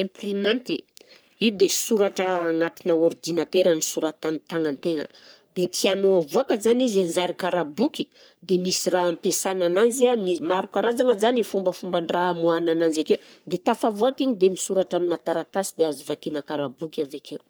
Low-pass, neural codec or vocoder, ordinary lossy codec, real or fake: none; codec, 44.1 kHz, 3.4 kbps, Pupu-Codec; none; fake